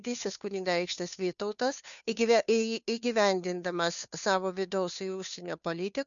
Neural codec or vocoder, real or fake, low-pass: codec, 16 kHz, 2 kbps, FunCodec, trained on Chinese and English, 25 frames a second; fake; 7.2 kHz